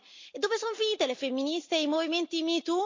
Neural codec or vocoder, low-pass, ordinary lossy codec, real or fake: none; 7.2 kHz; MP3, 64 kbps; real